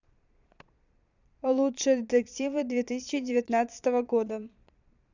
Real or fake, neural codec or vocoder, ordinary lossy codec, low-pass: fake; vocoder, 22.05 kHz, 80 mel bands, Vocos; none; 7.2 kHz